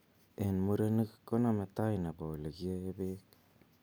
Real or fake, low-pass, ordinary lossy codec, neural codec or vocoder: real; none; none; none